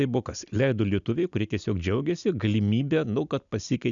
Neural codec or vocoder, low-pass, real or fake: none; 7.2 kHz; real